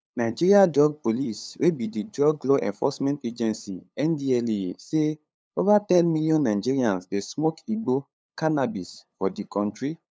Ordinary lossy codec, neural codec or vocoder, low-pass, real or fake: none; codec, 16 kHz, 8 kbps, FunCodec, trained on LibriTTS, 25 frames a second; none; fake